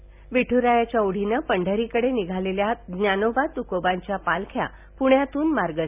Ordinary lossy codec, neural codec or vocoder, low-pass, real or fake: none; none; 3.6 kHz; real